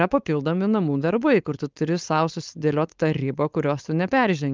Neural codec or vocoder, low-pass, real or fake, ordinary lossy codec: codec, 16 kHz, 4.8 kbps, FACodec; 7.2 kHz; fake; Opus, 24 kbps